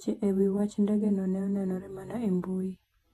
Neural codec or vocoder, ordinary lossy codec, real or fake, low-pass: vocoder, 48 kHz, 128 mel bands, Vocos; AAC, 32 kbps; fake; 19.8 kHz